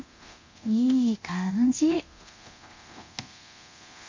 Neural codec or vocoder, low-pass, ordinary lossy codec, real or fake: codec, 24 kHz, 0.9 kbps, DualCodec; 7.2 kHz; MP3, 48 kbps; fake